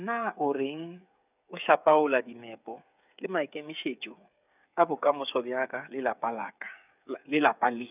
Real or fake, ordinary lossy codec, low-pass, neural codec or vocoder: fake; none; 3.6 kHz; codec, 16 kHz, 8 kbps, FreqCodec, smaller model